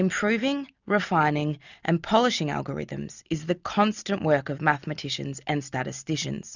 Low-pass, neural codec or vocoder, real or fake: 7.2 kHz; none; real